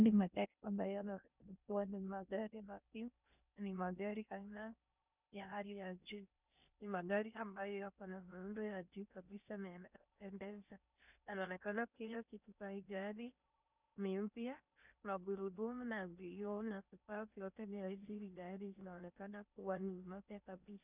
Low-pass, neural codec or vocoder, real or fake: 3.6 kHz; codec, 16 kHz in and 24 kHz out, 0.6 kbps, FocalCodec, streaming, 2048 codes; fake